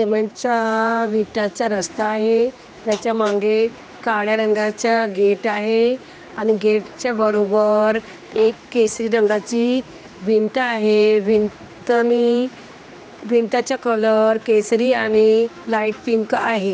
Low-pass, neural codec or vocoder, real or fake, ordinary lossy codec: none; codec, 16 kHz, 2 kbps, X-Codec, HuBERT features, trained on general audio; fake; none